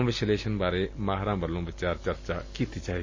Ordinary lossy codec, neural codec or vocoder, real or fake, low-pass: MP3, 32 kbps; none; real; 7.2 kHz